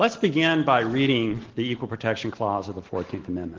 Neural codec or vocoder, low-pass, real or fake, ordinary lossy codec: none; 7.2 kHz; real; Opus, 16 kbps